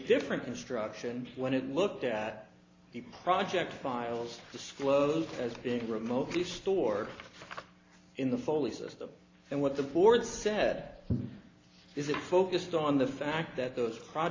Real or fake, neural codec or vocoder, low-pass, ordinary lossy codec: real; none; 7.2 kHz; AAC, 32 kbps